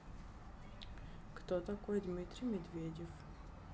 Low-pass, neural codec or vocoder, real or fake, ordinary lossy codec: none; none; real; none